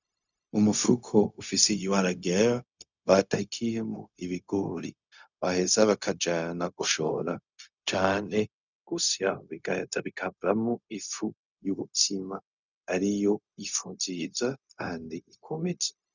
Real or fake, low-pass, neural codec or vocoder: fake; 7.2 kHz; codec, 16 kHz, 0.4 kbps, LongCat-Audio-Codec